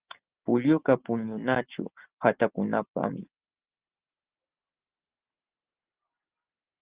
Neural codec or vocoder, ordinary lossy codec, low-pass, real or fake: none; Opus, 16 kbps; 3.6 kHz; real